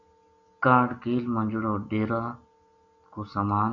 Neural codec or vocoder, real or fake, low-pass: none; real; 7.2 kHz